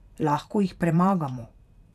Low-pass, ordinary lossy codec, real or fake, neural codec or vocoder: 14.4 kHz; none; fake; vocoder, 44.1 kHz, 128 mel bands every 512 samples, BigVGAN v2